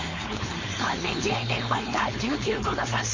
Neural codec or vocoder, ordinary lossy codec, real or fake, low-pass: codec, 16 kHz, 4.8 kbps, FACodec; MP3, 32 kbps; fake; 7.2 kHz